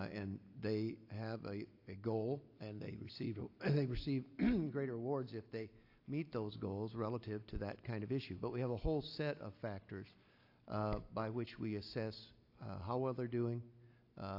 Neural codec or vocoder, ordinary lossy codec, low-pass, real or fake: none; AAC, 32 kbps; 5.4 kHz; real